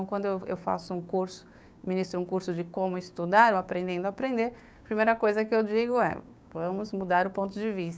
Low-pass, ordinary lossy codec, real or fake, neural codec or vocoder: none; none; fake; codec, 16 kHz, 6 kbps, DAC